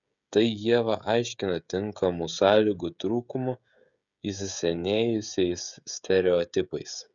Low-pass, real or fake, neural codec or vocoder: 7.2 kHz; fake; codec, 16 kHz, 16 kbps, FreqCodec, smaller model